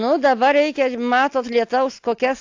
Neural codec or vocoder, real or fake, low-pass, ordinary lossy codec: none; real; 7.2 kHz; AAC, 48 kbps